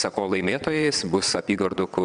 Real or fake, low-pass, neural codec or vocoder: fake; 9.9 kHz; vocoder, 22.05 kHz, 80 mel bands, WaveNeXt